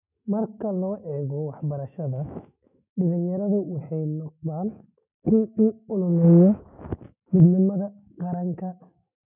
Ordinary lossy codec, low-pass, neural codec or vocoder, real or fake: none; 3.6 kHz; autoencoder, 48 kHz, 128 numbers a frame, DAC-VAE, trained on Japanese speech; fake